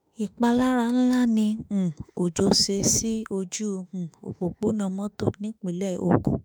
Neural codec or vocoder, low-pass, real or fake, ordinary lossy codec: autoencoder, 48 kHz, 32 numbers a frame, DAC-VAE, trained on Japanese speech; none; fake; none